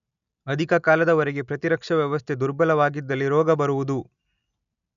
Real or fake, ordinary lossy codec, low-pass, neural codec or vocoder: real; none; 7.2 kHz; none